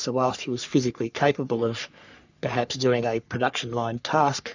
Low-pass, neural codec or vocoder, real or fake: 7.2 kHz; codec, 44.1 kHz, 3.4 kbps, Pupu-Codec; fake